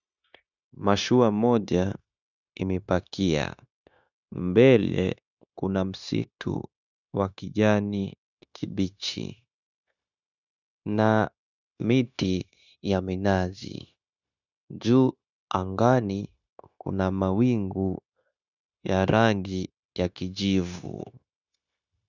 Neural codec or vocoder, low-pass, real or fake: codec, 16 kHz, 0.9 kbps, LongCat-Audio-Codec; 7.2 kHz; fake